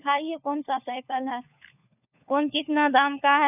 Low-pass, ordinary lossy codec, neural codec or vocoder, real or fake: 3.6 kHz; none; codec, 16 kHz, 4 kbps, FunCodec, trained on LibriTTS, 50 frames a second; fake